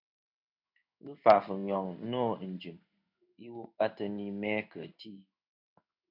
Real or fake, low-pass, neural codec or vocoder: fake; 5.4 kHz; codec, 16 kHz in and 24 kHz out, 1 kbps, XY-Tokenizer